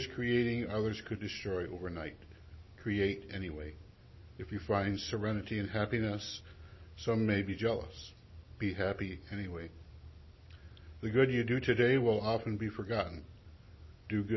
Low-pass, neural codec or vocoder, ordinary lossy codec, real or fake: 7.2 kHz; none; MP3, 24 kbps; real